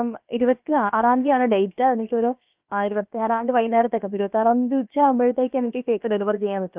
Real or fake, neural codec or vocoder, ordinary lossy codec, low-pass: fake; codec, 16 kHz, about 1 kbps, DyCAST, with the encoder's durations; Opus, 24 kbps; 3.6 kHz